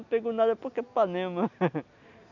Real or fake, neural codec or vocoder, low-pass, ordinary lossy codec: real; none; 7.2 kHz; none